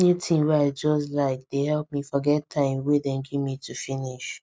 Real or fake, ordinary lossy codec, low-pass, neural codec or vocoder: real; none; none; none